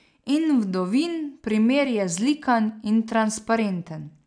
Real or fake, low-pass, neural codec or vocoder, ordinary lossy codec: real; 9.9 kHz; none; none